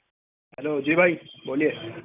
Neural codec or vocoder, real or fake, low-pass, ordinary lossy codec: none; real; 3.6 kHz; none